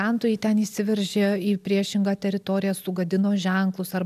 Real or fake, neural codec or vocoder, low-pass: real; none; 14.4 kHz